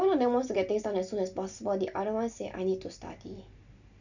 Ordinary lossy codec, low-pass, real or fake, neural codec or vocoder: none; 7.2 kHz; real; none